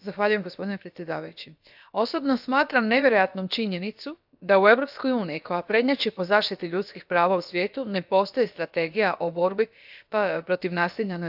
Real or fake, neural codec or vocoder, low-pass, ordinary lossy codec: fake; codec, 16 kHz, about 1 kbps, DyCAST, with the encoder's durations; 5.4 kHz; AAC, 48 kbps